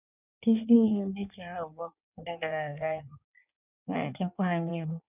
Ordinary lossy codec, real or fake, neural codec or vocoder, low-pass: none; fake; codec, 16 kHz in and 24 kHz out, 1.1 kbps, FireRedTTS-2 codec; 3.6 kHz